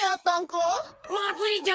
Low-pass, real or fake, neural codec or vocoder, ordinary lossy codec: none; fake; codec, 16 kHz, 4 kbps, FreqCodec, smaller model; none